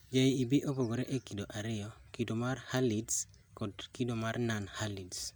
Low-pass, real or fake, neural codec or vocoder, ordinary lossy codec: none; real; none; none